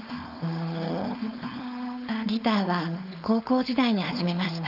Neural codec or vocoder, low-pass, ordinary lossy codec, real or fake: codec, 16 kHz, 4.8 kbps, FACodec; 5.4 kHz; none; fake